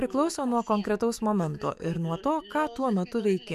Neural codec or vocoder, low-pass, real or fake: autoencoder, 48 kHz, 128 numbers a frame, DAC-VAE, trained on Japanese speech; 14.4 kHz; fake